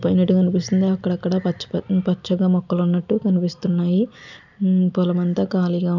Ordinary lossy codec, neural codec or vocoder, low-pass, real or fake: none; none; 7.2 kHz; real